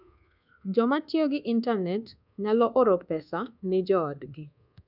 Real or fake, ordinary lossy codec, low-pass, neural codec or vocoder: fake; none; 5.4 kHz; codec, 24 kHz, 1.2 kbps, DualCodec